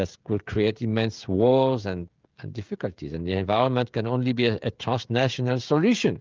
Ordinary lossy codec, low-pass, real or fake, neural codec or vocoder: Opus, 24 kbps; 7.2 kHz; fake; codec, 16 kHz, 16 kbps, FreqCodec, smaller model